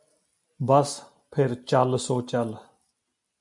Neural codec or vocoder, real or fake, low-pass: none; real; 10.8 kHz